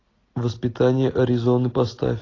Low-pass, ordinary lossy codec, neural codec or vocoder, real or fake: 7.2 kHz; AAC, 32 kbps; none; real